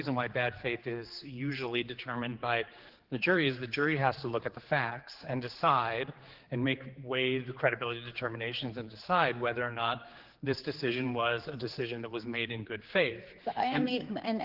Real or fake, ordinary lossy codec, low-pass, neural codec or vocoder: fake; Opus, 16 kbps; 5.4 kHz; codec, 16 kHz, 4 kbps, X-Codec, HuBERT features, trained on general audio